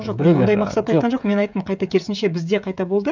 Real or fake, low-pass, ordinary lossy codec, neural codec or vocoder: fake; 7.2 kHz; none; codec, 16 kHz, 16 kbps, FreqCodec, smaller model